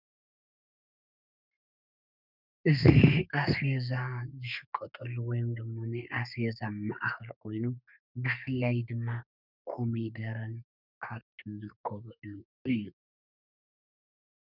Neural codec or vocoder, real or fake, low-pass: codec, 32 kHz, 1.9 kbps, SNAC; fake; 5.4 kHz